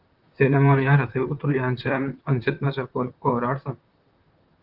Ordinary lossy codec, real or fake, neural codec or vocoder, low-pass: Opus, 32 kbps; fake; vocoder, 44.1 kHz, 128 mel bands, Pupu-Vocoder; 5.4 kHz